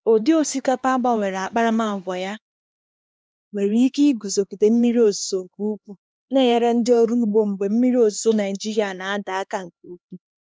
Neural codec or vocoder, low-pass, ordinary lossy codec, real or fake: codec, 16 kHz, 2 kbps, X-Codec, HuBERT features, trained on LibriSpeech; none; none; fake